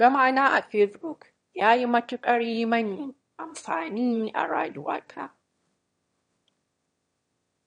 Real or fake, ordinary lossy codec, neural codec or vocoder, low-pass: fake; MP3, 48 kbps; autoencoder, 22.05 kHz, a latent of 192 numbers a frame, VITS, trained on one speaker; 9.9 kHz